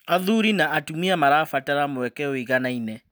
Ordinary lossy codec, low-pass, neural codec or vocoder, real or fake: none; none; none; real